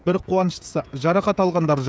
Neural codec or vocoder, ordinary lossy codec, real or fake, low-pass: codec, 16 kHz, 16 kbps, FreqCodec, smaller model; none; fake; none